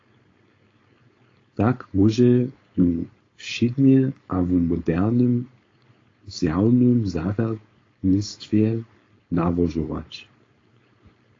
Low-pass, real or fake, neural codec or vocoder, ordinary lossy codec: 7.2 kHz; fake; codec, 16 kHz, 4.8 kbps, FACodec; AAC, 32 kbps